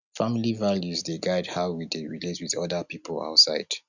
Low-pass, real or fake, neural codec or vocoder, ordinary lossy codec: 7.2 kHz; real; none; none